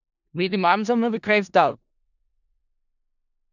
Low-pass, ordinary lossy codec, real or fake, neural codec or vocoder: 7.2 kHz; none; fake; codec, 16 kHz in and 24 kHz out, 0.4 kbps, LongCat-Audio-Codec, four codebook decoder